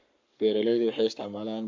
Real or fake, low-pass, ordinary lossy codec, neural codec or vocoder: fake; 7.2 kHz; MP3, 48 kbps; codec, 44.1 kHz, 7.8 kbps, Pupu-Codec